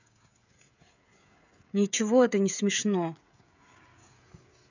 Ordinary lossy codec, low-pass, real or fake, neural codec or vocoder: none; 7.2 kHz; fake; codec, 16 kHz, 16 kbps, FreqCodec, smaller model